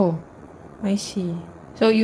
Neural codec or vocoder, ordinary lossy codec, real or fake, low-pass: vocoder, 22.05 kHz, 80 mel bands, WaveNeXt; none; fake; none